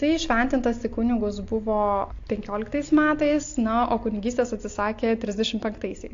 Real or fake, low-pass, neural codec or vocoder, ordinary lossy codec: real; 7.2 kHz; none; AAC, 48 kbps